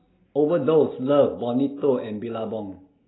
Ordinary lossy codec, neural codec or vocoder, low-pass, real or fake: AAC, 16 kbps; none; 7.2 kHz; real